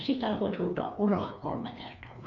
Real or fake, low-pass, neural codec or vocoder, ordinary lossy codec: fake; 7.2 kHz; codec, 16 kHz, 2 kbps, FreqCodec, larger model; none